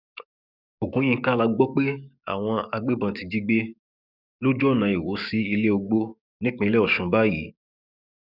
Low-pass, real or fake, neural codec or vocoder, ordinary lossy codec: 5.4 kHz; fake; codec, 44.1 kHz, 7.8 kbps, DAC; none